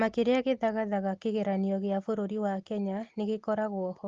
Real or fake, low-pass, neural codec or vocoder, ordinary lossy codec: real; 7.2 kHz; none; Opus, 16 kbps